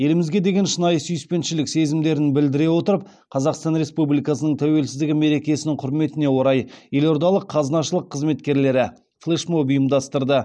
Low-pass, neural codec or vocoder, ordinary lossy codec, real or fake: none; none; none; real